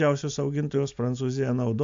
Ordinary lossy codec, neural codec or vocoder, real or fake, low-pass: AAC, 64 kbps; none; real; 7.2 kHz